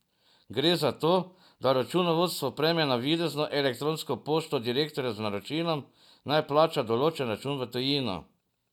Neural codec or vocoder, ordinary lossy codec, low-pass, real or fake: none; none; 19.8 kHz; real